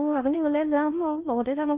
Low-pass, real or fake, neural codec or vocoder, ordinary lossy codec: 3.6 kHz; fake; codec, 16 kHz in and 24 kHz out, 0.6 kbps, FocalCodec, streaming, 4096 codes; Opus, 24 kbps